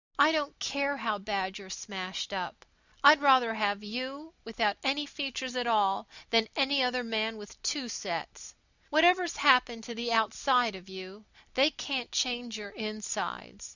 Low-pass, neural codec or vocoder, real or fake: 7.2 kHz; none; real